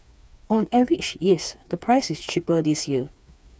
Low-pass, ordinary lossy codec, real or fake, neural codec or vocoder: none; none; fake; codec, 16 kHz, 4 kbps, FreqCodec, smaller model